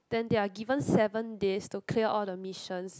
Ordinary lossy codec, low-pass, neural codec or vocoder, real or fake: none; none; none; real